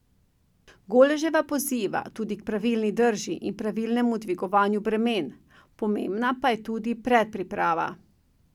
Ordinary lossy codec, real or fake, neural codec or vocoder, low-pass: none; real; none; 19.8 kHz